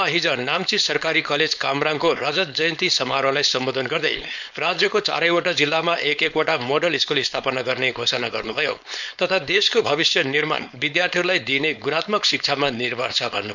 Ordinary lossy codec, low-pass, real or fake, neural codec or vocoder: none; 7.2 kHz; fake; codec, 16 kHz, 4.8 kbps, FACodec